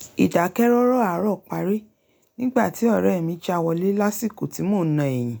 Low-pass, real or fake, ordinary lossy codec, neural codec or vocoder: none; real; none; none